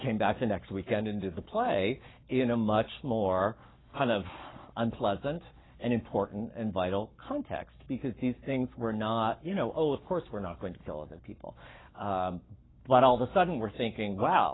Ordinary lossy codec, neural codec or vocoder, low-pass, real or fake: AAC, 16 kbps; codec, 44.1 kHz, 7.8 kbps, Pupu-Codec; 7.2 kHz; fake